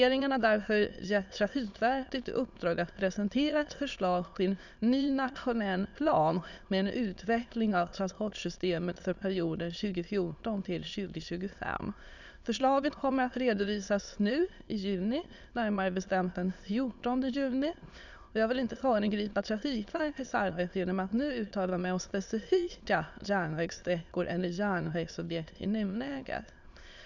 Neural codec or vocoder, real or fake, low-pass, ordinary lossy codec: autoencoder, 22.05 kHz, a latent of 192 numbers a frame, VITS, trained on many speakers; fake; 7.2 kHz; none